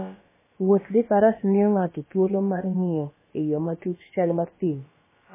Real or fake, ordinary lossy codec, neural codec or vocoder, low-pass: fake; MP3, 16 kbps; codec, 16 kHz, about 1 kbps, DyCAST, with the encoder's durations; 3.6 kHz